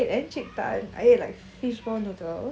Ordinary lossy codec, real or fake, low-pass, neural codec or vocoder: none; real; none; none